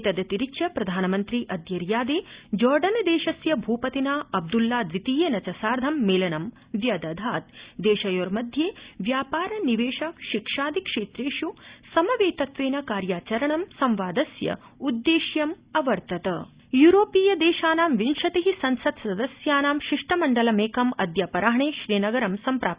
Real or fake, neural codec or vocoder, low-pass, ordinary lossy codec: real; none; 3.6 kHz; Opus, 64 kbps